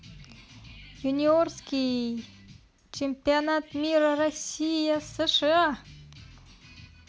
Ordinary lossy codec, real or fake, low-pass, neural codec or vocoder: none; real; none; none